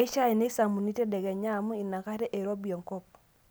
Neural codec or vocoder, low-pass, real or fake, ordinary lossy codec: none; none; real; none